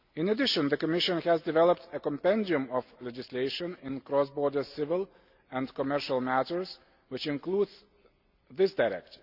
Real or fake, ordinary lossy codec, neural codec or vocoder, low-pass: real; Opus, 64 kbps; none; 5.4 kHz